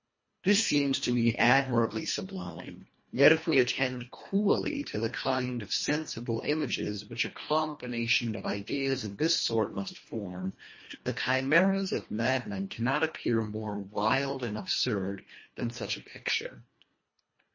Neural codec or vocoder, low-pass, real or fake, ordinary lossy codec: codec, 24 kHz, 1.5 kbps, HILCodec; 7.2 kHz; fake; MP3, 32 kbps